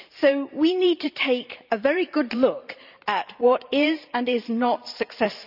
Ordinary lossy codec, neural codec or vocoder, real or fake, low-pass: none; vocoder, 44.1 kHz, 128 mel bands every 512 samples, BigVGAN v2; fake; 5.4 kHz